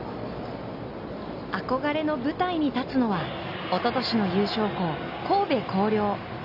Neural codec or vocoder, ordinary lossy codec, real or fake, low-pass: none; none; real; 5.4 kHz